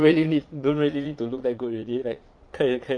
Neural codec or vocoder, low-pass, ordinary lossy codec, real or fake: vocoder, 22.05 kHz, 80 mel bands, Vocos; 9.9 kHz; none; fake